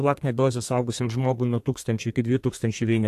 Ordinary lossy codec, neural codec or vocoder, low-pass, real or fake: AAC, 64 kbps; codec, 32 kHz, 1.9 kbps, SNAC; 14.4 kHz; fake